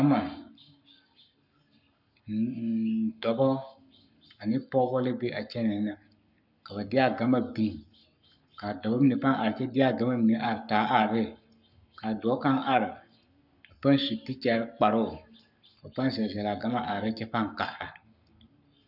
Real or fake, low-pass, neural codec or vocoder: fake; 5.4 kHz; codec, 44.1 kHz, 7.8 kbps, Pupu-Codec